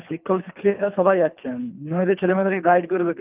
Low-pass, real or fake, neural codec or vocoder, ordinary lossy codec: 3.6 kHz; fake; codec, 24 kHz, 3 kbps, HILCodec; Opus, 24 kbps